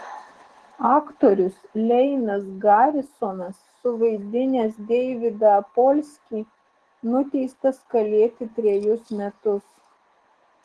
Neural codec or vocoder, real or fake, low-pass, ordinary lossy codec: autoencoder, 48 kHz, 128 numbers a frame, DAC-VAE, trained on Japanese speech; fake; 10.8 kHz; Opus, 16 kbps